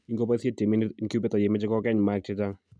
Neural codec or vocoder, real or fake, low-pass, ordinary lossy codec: none; real; 9.9 kHz; none